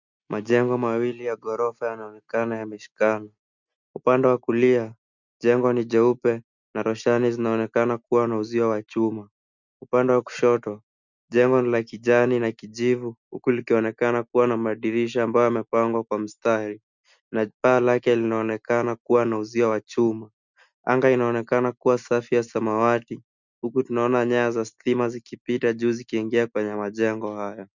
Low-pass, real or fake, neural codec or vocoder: 7.2 kHz; real; none